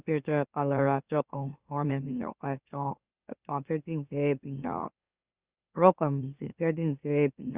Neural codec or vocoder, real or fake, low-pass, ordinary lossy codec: autoencoder, 44.1 kHz, a latent of 192 numbers a frame, MeloTTS; fake; 3.6 kHz; Opus, 64 kbps